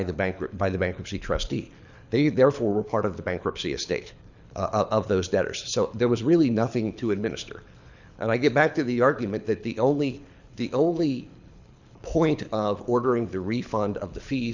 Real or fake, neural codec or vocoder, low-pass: fake; codec, 24 kHz, 6 kbps, HILCodec; 7.2 kHz